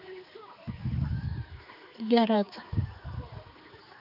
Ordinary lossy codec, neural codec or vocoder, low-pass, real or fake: none; codec, 16 kHz, 4 kbps, X-Codec, HuBERT features, trained on general audio; 5.4 kHz; fake